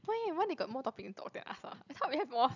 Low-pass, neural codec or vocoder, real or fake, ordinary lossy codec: 7.2 kHz; none; real; none